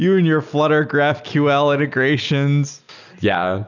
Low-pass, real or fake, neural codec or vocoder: 7.2 kHz; real; none